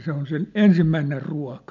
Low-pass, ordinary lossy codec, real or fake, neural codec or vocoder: 7.2 kHz; MP3, 64 kbps; real; none